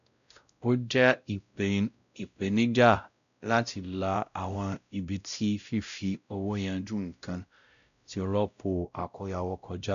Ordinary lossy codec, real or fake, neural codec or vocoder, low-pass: none; fake; codec, 16 kHz, 0.5 kbps, X-Codec, WavLM features, trained on Multilingual LibriSpeech; 7.2 kHz